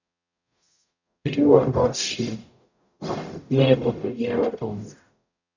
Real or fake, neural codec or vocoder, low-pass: fake; codec, 44.1 kHz, 0.9 kbps, DAC; 7.2 kHz